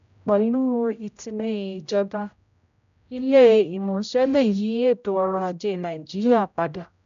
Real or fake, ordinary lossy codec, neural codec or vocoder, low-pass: fake; none; codec, 16 kHz, 0.5 kbps, X-Codec, HuBERT features, trained on general audio; 7.2 kHz